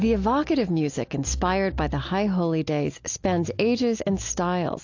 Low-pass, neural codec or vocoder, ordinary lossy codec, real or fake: 7.2 kHz; none; AAC, 48 kbps; real